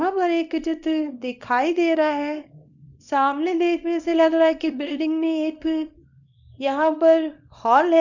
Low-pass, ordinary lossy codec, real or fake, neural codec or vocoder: 7.2 kHz; none; fake; codec, 24 kHz, 0.9 kbps, WavTokenizer, small release